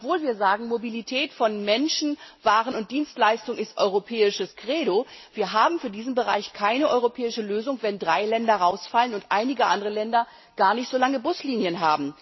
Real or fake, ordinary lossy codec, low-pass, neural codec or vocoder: real; MP3, 24 kbps; 7.2 kHz; none